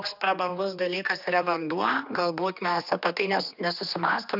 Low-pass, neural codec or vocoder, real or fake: 5.4 kHz; codec, 16 kHz, 2 kbps, X-Codec, HuBERT features, trained on general audio; fake